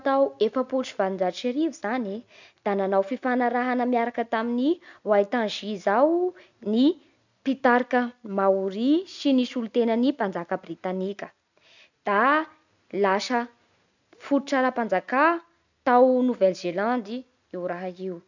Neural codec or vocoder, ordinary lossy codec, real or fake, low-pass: none; none; real; 7.2 kHz